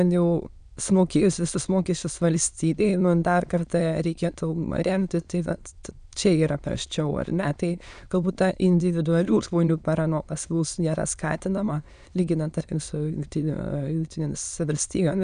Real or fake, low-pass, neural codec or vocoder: fake; 9.9 kHz; autoencoder, 22.05 kHz, a latent of 192 numbers a frame, VITS, trained on many speakers